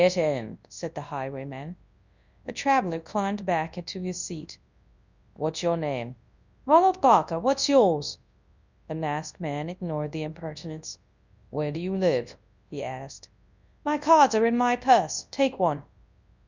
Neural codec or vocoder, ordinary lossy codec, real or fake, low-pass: codec, 24 kHz, 0.9 kbps, WavTokenizer, large speech release; Opus, 64 kbps; fake; 7.2 kHz